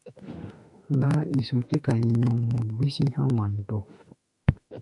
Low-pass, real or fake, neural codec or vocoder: 10.8 kHz; fake; autoencoder, 48 kHz, 32 numbers a frame, DAC-VAE, trained on Japanese speech